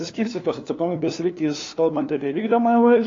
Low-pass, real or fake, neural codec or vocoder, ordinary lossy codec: 7.2 kHz; fake; codec, 16 kHz, 2 kbps, FunCodec, trained on LibriTTS, 25 frames a second; AAC, 32 kbps